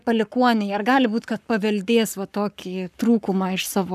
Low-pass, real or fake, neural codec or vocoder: 14.4 kHz; fake; codec, 44.1 kHz, 7.8 kbps, Pupu-Codec